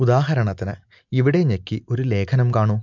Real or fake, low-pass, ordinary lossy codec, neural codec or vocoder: real; 7.2 kHz; MP3, 64 kbps; none